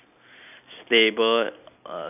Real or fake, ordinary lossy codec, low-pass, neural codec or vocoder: real; none; 3.6 kHz; none